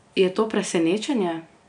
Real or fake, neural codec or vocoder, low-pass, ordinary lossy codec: real; none; 9.9 kHz; none